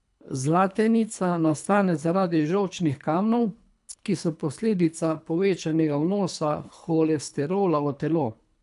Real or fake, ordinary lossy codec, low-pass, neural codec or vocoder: fake; none; 10.8 kHz; codec, 24 kHz, 3 kbps, HILCodec